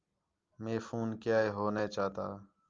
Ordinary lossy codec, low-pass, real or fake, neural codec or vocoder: Opus, 32 kbps; 7.2 kHz; real; none